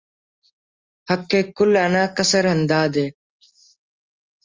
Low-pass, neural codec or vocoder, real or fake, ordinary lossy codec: 7.2 kHz; none; real; Opus, 32 kbps